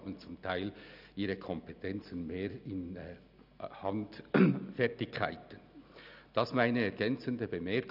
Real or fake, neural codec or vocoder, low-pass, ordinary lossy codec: real; none; 5.4 kHz; none